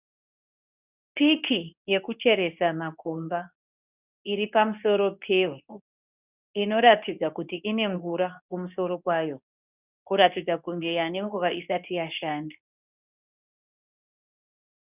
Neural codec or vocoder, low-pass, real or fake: codec, 24 kHz, 0.9 kbps, WavTokenizer, medium speech release version 2; 3.6 kHz; fake